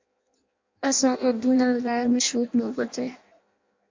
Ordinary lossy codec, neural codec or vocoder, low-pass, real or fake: MP3, 48 kbps; codec, 16 kHz in and 24 kHz out, 0.6 kbps, FireRedTTS-2 codec; 7.2 kHz; fake